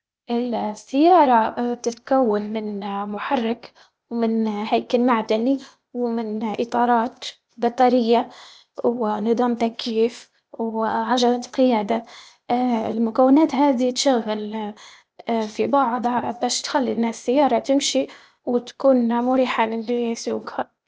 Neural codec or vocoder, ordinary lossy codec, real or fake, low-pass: codec, 16 kHz, 0.8 kbps, ZipCodec; none; fake; none